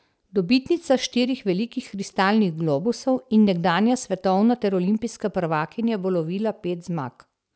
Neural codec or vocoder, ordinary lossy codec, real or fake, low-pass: none; none; real; none